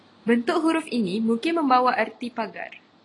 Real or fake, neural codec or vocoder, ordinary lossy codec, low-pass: real; none; AAC, 32 kbps; 10.8 kHz